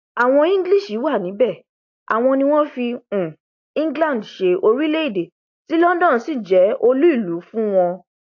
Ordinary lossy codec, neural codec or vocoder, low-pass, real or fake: AAC, 48 kbps; none; 7.2 kHz; real